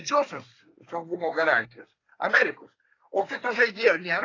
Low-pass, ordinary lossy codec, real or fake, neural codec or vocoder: 7.2 kHz; AAC, 32 kbps; fake; codec, 24 kHz, 1 kbps, SNAC